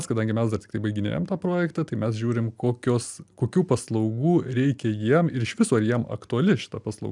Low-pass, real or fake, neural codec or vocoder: 10.8 kHz; real; none